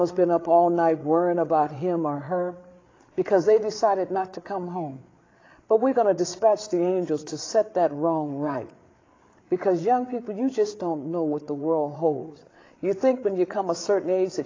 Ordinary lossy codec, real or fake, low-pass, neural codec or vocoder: AAC, 32 kbps; fake; 7.2 kHz; codec, 16 kHz, 8 kbps, FreqCodec, larger model